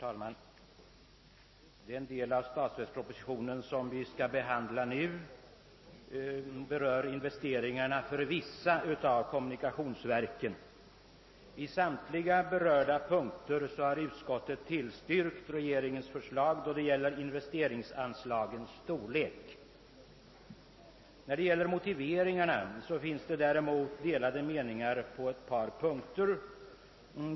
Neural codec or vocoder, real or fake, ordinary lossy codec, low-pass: none; real; MP3, 24 kbps; 7.2 kHz